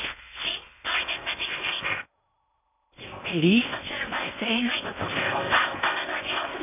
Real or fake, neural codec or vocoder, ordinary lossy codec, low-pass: fake; codec, 16 kHz in and 24 kHz out, 0.8 kbps, FocalCodec, streaming, 65536 codes; none; 3.6 kHz